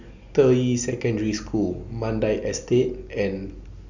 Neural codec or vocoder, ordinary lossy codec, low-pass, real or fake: none; none; 7.2 kHz; real